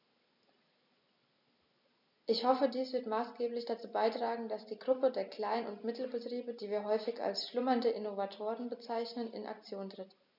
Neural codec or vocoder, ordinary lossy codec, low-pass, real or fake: none; none; 5.4 kHz; real